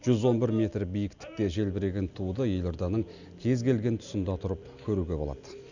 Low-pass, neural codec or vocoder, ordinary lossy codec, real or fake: 7.2 kHz; none; none; real